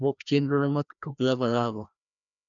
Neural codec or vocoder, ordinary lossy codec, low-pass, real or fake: codec, 16 kHz, 1 kbps, FreqCodec, larger model; none; 7.2 kHz; fake